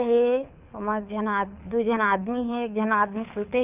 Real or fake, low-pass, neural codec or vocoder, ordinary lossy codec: fake; 3.6 kHz; codec, 24 kHz, 6 kbps, HILCodec; none